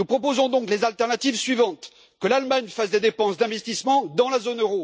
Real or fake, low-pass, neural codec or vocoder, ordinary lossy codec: real; none; none; none